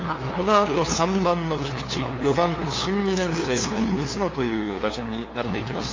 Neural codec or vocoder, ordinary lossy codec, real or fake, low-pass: codec, 16 kHz, 2 kbps, FunCodec, trained on LibriTTS, 25 frames a second; AAC, 32 kbps; fake; 7.2 kHz